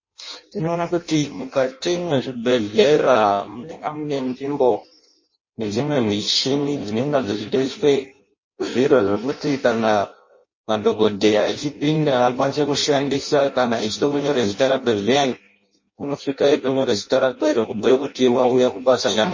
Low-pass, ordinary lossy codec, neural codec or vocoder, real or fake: 7.2 kHz; MP3, 32 kbps; codec, 16 kHz in and 24 kHz out, 0.6 kbps, FireRedTTS-2 codec; fake